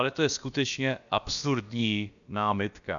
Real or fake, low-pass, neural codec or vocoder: fake; 7.2 kHz; codec, 16 kHz, about 1 kbps, DyCAST, with the encoder's durations